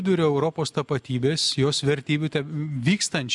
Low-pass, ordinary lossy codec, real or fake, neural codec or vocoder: 10.8 kHz; MP3, 96 kbps; real; none